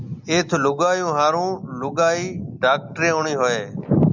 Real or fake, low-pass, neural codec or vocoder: real; 7.2 kHz; none